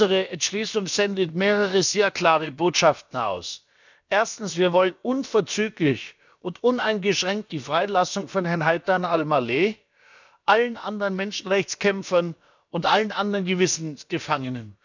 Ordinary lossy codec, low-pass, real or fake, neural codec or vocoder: none; 7.2 kHz; fake; codec, 16 kHz, about 1 kbps, DyCAST, with the encoder's durations